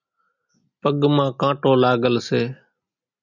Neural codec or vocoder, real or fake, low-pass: none; real; 7.2 kHz